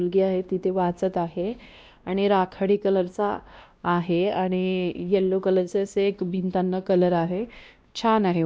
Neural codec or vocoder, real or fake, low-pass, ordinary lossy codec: codec, 16 kHz, 1 kbps, X-Codec, WavLM features, trained on Multilingual LibriSpeech; fake; none; none